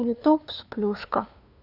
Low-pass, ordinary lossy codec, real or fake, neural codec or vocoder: 5.4 kHz; none; fake; codec, 16 kHz, 2 kbps, FunCodec, trained on LibriTTS, 25 frames a second